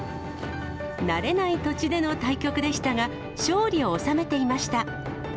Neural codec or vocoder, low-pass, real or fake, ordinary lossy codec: none; none; real; none